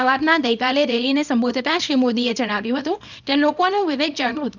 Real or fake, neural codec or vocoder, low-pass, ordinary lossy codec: fake; codec, 24 kHz, 0.9 kbps, WavTokenizer, small release; 7.2 kHz; none